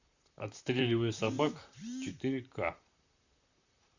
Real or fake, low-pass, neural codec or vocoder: fake; 7.2 kHz; vocoder, 44.1 kHz, 128 mel bands, Pupu-Vocoder